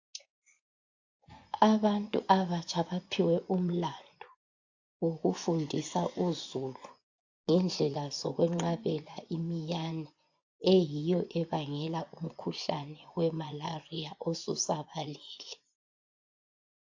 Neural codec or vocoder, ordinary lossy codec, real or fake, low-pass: vocoder, 24 kHz, 100 mel bands, Vocos; AAC, 48 kbps; fake; 7.2 kHz